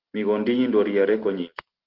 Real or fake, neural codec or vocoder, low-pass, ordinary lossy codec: real; none; 5.4 kHz; Opus, 16 kbps